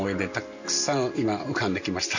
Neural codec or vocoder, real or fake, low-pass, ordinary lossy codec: none; real; 7.2 kHz; none